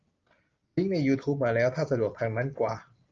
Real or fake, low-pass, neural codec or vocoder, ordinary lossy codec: real; 7.2 kHz; none; Opus, 24 kbps